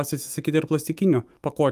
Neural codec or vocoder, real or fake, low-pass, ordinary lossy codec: autoencoder, 48 kHz, 128 numbers a frame, DAC-VAE, trained on Japanese speech; fake; 14.4 kHz; Opus, 32 kbps